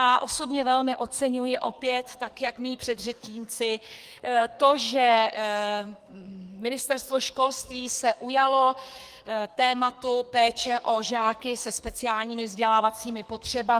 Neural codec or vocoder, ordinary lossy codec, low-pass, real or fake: codec, 44.1 kHz, 2.6 kbps, SNAC; Opus, 32 kbps; 14.4 kHz; fake